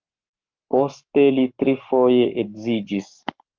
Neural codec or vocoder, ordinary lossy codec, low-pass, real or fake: codec, 44.1 kHz, 7.8 kbps, Pupu-Codec; Opus, 32 kbps; 7.2 kHz; fake